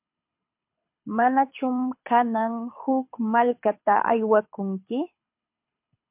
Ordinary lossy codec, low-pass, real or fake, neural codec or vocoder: MP3, 32 kbps; 3.6 kHz; fake; codec, 24 kHz, 6 kbps, HILCodec